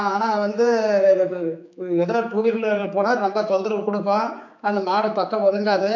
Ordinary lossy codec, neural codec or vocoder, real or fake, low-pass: none; codec, 16 kHz, 4 kbps, X-Codec, HuBERT features, trained on general audio; fake; 7.2 kHz